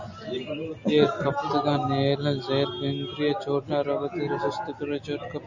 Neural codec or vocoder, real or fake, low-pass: none; real; 7.2 kHz